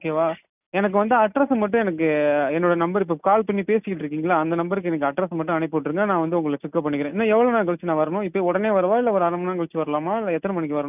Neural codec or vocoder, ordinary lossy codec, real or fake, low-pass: none; none; real; 3.6 kHz